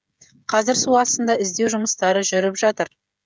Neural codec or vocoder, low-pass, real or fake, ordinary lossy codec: codec, 16 kHz, 16 kbps, FreqCodec, smaller model; none; fake; none